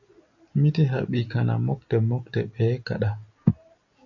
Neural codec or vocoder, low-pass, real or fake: none; 7.2 kHz; real